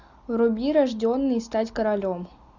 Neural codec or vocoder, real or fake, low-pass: none; real; 7.2 kHz